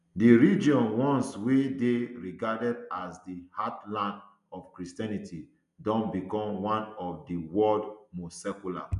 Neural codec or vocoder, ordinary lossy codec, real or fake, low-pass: none; none; real; 10.8 kHz